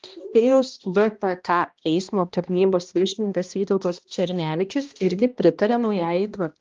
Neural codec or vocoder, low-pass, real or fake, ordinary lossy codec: codec, 16 kHz, 1 kbps, X-Codec, HuBERT features, trained on balanced general audio; 7.2 kHz; fake; Opus, 32 kbps